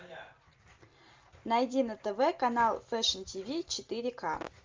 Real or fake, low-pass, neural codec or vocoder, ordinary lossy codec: real; 7.2 kHz; none; Opus, 24 kbps